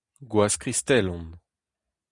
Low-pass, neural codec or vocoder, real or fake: 10.8 kHz; none; real